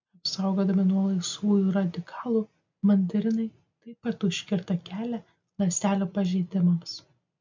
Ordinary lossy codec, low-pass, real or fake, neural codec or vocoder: MP3, 64 kbps; 7.2 kHz; real; none